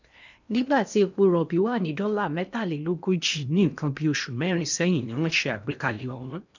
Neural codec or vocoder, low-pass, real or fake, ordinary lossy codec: codec, 16 kHz in and 24 kHz out, 0.8 kbps, FocalCodec, streaming, 65536 codes; 7.2 kHz; fake; none